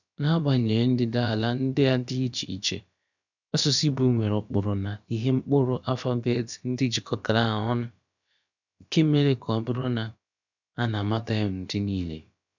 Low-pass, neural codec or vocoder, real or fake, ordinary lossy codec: 7.2 kHz; codec, 16 kHz, about 1 kbps, DyCAST, with the encoder's durations; fake; none